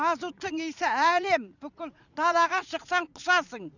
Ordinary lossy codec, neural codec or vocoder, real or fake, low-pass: none; vocoder, 44.1 kHz, 80 mel bands, Vocos; fake; 7.2 kHz